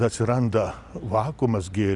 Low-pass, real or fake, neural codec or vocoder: 10.8 kHz; real; none